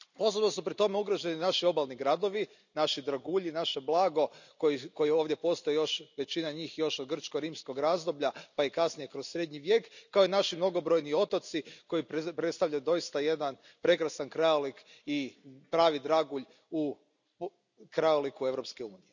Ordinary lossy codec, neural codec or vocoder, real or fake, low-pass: none; none; real; 7.2 kHz